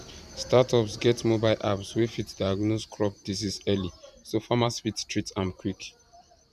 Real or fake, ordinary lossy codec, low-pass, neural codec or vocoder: fake; none; 14.4 kHz; vocoder, 44.1 kHz, 128 mel bands every 512 samples, BigVGAN v2